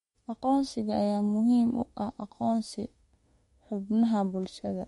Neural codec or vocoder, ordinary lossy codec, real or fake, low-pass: autoencoder, 48 kHz, 32 numbers a frame, DAC-VAE, trained on Japanese speech; MP3, 48 kbps; fake; 19.8 kHz